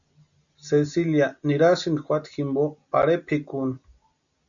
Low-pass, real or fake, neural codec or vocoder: 7.2 kHz; real; none